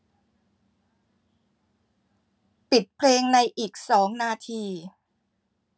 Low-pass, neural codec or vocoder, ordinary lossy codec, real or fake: none; none; none; real